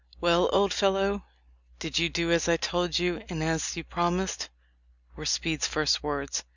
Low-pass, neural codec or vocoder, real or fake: 7.2 kHz; none; real